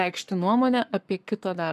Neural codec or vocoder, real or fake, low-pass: codec, 44.1 kHz, 7.8 kbps, Pupu-Codec; fake; 14.4 kHz